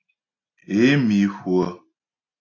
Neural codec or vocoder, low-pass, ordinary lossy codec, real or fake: none; 7.2 kHz; AAC, 32 kbps; real